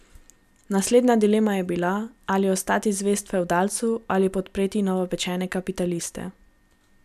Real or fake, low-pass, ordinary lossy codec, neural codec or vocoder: real; 14.4 kHz; none; none